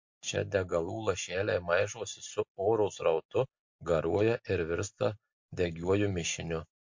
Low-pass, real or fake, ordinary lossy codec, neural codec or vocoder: 7.2 kHz; real; MP3, 48 kbps; none